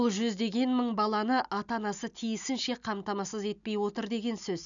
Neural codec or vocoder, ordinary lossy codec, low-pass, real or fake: none; none; 7.2 kHz; real